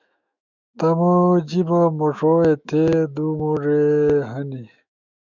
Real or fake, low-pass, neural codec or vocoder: fake; 7.2 kHz; autoencoder, 48 kHz, 128 numbers a frame, DAC-VAE, trained on Japanese speech